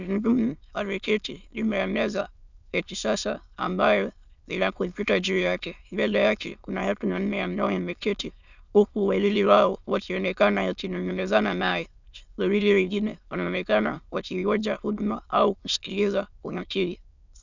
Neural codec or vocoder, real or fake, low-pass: autoencoder, 22.05 kHz, a latent of 192 numbers a frame, VITS, trained on many speakers; fake; 7.2 kHz